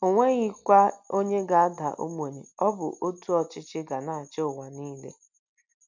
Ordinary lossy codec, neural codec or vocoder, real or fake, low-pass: none; none; real; 7.2 kHz